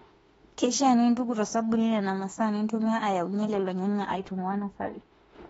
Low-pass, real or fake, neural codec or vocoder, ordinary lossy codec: 19.8 kHz; fake; autoencoder, 48 kHz, 32 numbers a frame, DAC-VAE, trained on Japanese speech; AAC, 24 kbps